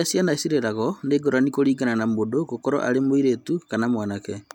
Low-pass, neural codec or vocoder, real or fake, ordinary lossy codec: 19.8 kHz; none; real; none